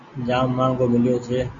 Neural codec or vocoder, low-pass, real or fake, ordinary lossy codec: none; 7.2 kHz; real; AAC, 64 kbps